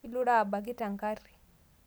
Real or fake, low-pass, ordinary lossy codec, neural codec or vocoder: real; none; none; none